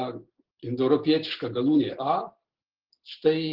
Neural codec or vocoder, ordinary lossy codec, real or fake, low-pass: none; Opus, 16 kbps; real; 5.4 kHz